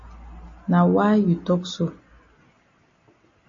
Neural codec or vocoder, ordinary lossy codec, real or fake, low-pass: none; MP3, 32 kbps; real; 7.2 kHz